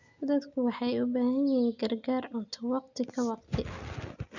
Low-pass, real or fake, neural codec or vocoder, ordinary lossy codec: 7.2 kHz; real; none; none